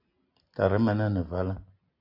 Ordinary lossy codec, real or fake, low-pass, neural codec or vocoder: AAC, 48 kbps; real; 5.4 kHz; none